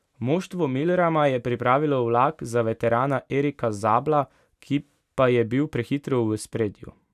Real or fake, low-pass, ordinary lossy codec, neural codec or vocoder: real; 14.4 kHz; none; none